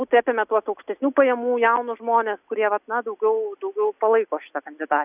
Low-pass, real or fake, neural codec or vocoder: 3.6 kHz; real; none